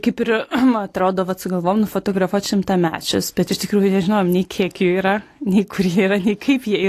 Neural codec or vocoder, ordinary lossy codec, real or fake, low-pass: none; AAC, 48 kbps; real; 14.4 kHz